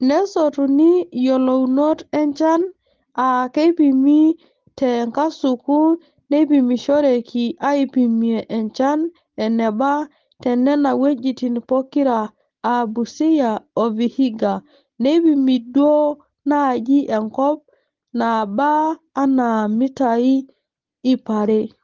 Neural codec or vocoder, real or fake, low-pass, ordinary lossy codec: none; real; 7.2 kHz; Opus, 16 kbps